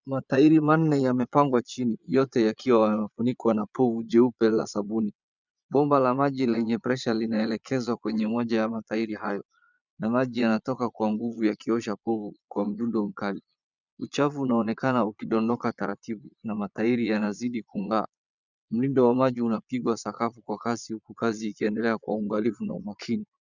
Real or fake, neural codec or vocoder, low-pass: fake; vocoder, 22.05 kHz, 80 mel bands, Vocos; 7.2 kHz